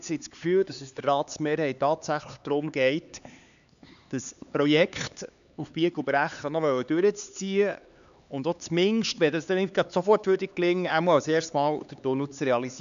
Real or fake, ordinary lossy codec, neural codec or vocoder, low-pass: fake; none; codec, 16 kHz, 4 kbps, X-Codec, HuBERT features, trained on LibriSpeech; 7.2 kHz